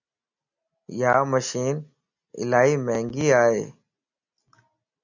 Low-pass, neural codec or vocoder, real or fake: 7.2 kHz; none; real